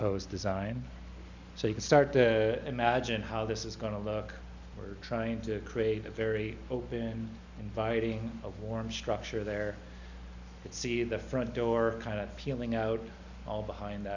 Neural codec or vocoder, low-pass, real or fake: none; 7.2 kHz; real